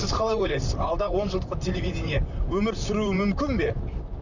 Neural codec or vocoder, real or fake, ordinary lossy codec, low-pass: vocoder, 44.1 kHz, 128 mel bands, Pupu-Vocoder; fake; none; 7.2 kHz